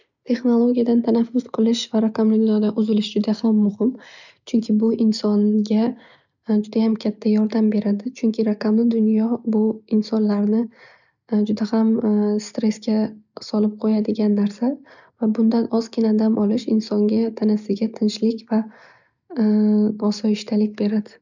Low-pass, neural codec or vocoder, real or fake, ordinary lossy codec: 7.2 kHz; none; real; none